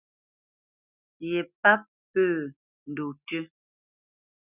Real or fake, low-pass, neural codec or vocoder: real; 3.6 kHz; none